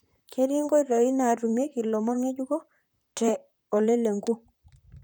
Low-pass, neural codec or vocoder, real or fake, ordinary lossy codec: none; vocoder, 44.1 kHz, 128 mel bands, Pupu-Vocoder; fake; none